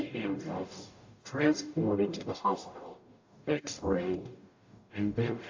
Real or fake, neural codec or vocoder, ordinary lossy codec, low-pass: fake; codec, 44.1 kHz, 0.9 kbps, DAC; AAC, 48 kbps; 7.2 kHz